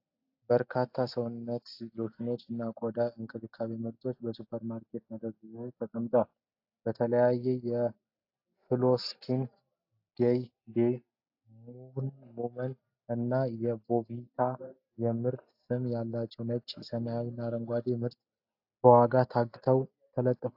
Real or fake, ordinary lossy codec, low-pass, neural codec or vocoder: real; AAC, 48 kbps; 5.4 kHz; none